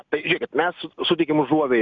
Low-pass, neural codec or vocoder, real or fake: 7.2 kHz; none; real